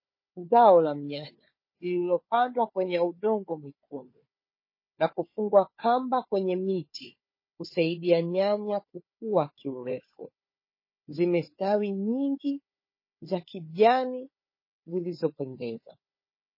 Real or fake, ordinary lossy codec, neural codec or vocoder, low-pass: fake; MP3, 24 kbps; codec, 16 kHz, 4 kbps, FunCodec, trained on Chinese and English, 50 frames a second; 5.4 kHz